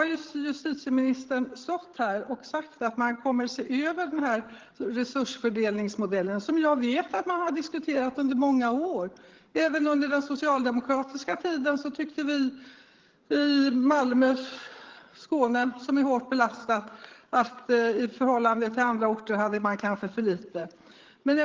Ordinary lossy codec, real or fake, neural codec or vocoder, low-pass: Opus, 16 kbps; fake; codec, 16 kHz, 8 kbps, FreqCodec, larger model; 7.2 kHz